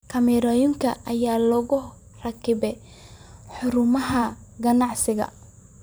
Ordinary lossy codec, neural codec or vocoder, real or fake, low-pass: none; vocoder, 44.1 kHz, 128 mel bands every 512 samples, BigVGAN v2; fake; none